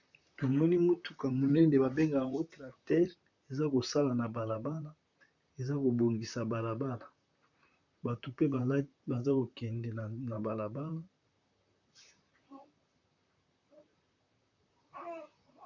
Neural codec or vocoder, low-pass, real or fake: vocoder, 44.1 kHz, 128 mel bands, Pupu-Vocoder; 7.2 kHz; fake